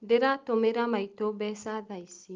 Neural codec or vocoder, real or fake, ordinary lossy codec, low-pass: none; real; Opus, 24 kbps; 7.2 kHz